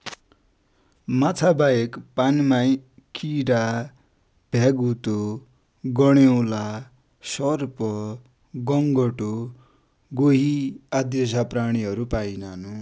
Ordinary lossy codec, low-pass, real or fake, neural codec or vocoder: none; none; real; none